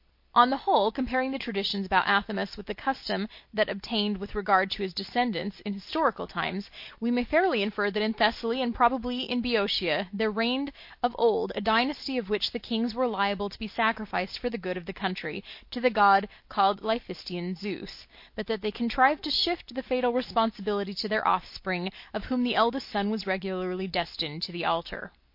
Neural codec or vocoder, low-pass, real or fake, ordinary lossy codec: none; 5.4 kHz; real; MP3, 32 kbps